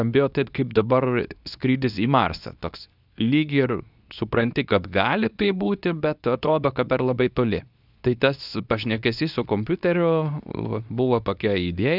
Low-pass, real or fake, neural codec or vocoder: 5.4 kHz; fake; codec, 24 kHz, 0.9 kbps, WavTokenizer, medium speech release version 2